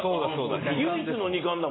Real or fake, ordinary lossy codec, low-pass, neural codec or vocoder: real; AAC, 16 kbps; 7.2 kHz; none